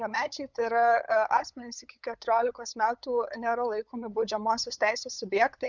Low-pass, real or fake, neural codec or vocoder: 7.2 kHz; fake; codec, 16 kHz, 8 kbps, FunCodec, trained on LibriTTS, 25 frames a second